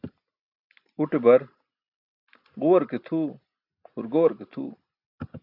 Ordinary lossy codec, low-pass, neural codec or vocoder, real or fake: AAC, 48 kbps; 5.4 kHz; none; real